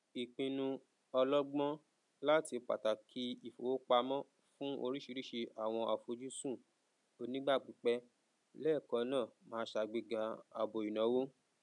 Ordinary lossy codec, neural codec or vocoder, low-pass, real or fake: MP3, 96 kbps; none; 10.8 kHz; real